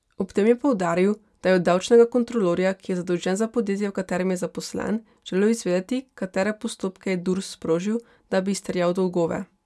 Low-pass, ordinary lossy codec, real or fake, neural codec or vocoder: none; none; real; none